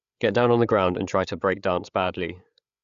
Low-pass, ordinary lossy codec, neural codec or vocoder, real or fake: 7.2 kHz; Opus, 64 kbps; codec, 16 kHz, 8 kbps, FreqCodec, larger model; fake